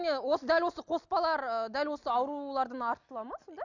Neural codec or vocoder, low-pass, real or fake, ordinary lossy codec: none; 7.2 kHz; real; none